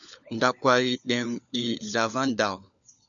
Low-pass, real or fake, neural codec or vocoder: 7.2 kHz; fake; codec, 16 kHz, 4 kbps, FunCodec, trained on LibriTTS, 50 frames a second